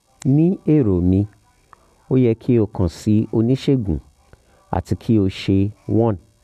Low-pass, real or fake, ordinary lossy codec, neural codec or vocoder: 14.4 kHz; real; none; none